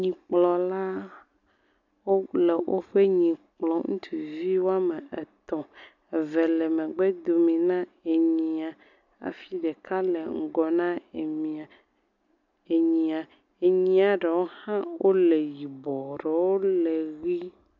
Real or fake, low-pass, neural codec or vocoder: real; 7.2 kHz; none